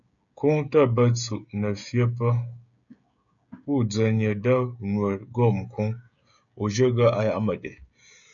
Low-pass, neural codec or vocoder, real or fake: 7.2 kHz; codec, 16 kHz, 16 kbps, FreqCodec, smaller model; fake